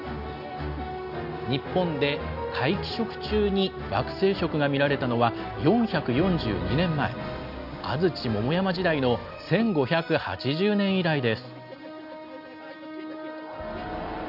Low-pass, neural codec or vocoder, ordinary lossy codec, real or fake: 5.4 kHz; none; none; real